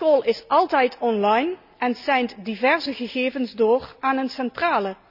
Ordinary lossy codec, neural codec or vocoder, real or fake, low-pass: none; none; real; 5.4 kHz